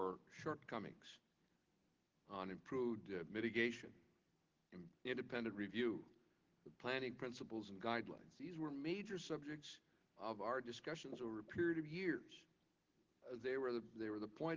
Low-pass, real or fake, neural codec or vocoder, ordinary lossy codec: 7.2 kHz; real; none; Opus, 16 kbps